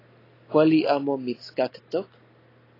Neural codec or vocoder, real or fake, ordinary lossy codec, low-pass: none; real; AAC, 24 kbps; 5.4 kHz